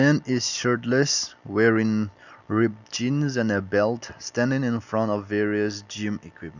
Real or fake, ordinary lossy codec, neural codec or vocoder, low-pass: real; none; none; 7.2 kHz